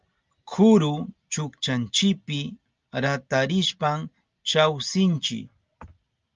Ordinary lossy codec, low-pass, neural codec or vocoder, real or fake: Opus, 32 kbps; 7.2 kHz; none; real